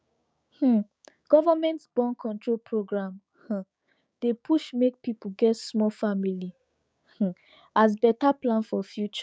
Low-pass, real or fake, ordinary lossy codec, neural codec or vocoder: none; fake; none; codec, 16 kHz, 6 kbps, DAC